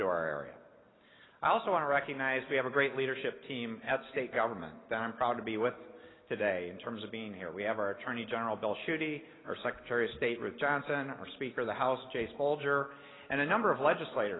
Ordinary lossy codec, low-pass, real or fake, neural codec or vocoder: AAC, 16 kbps; 7.2 kHz; real; none